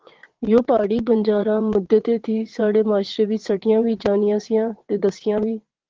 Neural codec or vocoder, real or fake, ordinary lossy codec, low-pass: vocoder, 22.05 kHz, 80 mel bands, WaveNeXt; fake; Opus, 16 kbps; 7.2 kHz